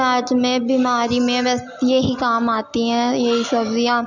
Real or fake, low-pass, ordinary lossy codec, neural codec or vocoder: real; 7.2 kHz; none; none